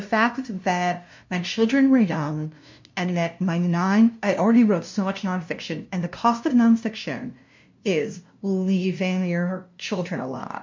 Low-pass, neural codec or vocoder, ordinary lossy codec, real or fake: 7.2 kHz; codec, 16 kHz, 0.5 kbps, FunCodec, trained on LibriTTS, 25 frames a second; MP3, 48 kbps; fake